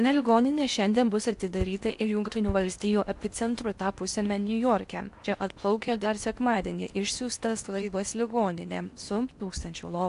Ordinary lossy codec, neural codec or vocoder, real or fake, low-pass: AAC, 64 kbps; codec, 16 kHz in and 24 kHz out, 0.8 kbps, FocalCodec, streaming, 65536 codes; fake; 10.8 kHz